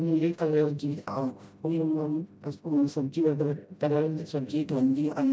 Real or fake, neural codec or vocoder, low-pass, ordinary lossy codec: fake; codec, 16 kHz, 0.5 kbps, FreqCodec, smaller model; none; none